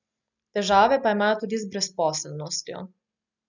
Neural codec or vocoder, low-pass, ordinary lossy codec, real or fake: none; 7.2 kHz; none; real